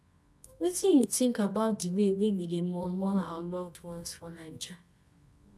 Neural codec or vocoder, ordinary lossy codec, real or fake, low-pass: codec, 24 kHz, 0.9 kbps, WavTokenizer, medium music audio release; none; fake; none